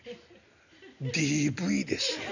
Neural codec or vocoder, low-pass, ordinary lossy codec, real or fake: none; 7.2 kHz; none; real